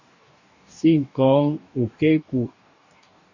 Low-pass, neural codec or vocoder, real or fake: 7.2 kHz; codec, 44.1 kHz, 2.6 kbps, DAC; fake